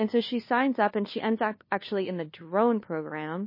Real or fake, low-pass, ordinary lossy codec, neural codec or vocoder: fake; 5.4 kHz; MP3, 24 kbps; codec, 16 kHz, 2 kbps, FunCodec, trained on LibriTTS, 25 frames a second